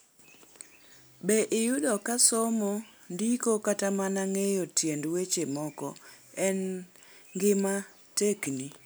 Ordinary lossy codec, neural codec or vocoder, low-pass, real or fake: none; none; none; real